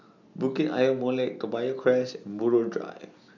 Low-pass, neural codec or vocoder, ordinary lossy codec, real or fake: 7.2 kHz; none; none; real